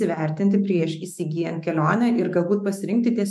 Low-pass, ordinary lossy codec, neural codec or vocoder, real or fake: 14.4 kHz; MP3, 64 kbps; autoencoder, 48 kHz, 128 numbers a frame, DAC-VAE, trained on Japanese speech; fake